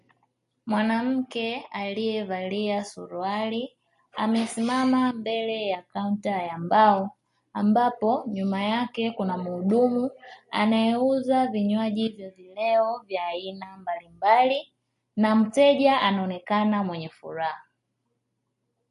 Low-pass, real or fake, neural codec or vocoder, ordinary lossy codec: 14.4 kHz; real; none; MP3, 48 kbps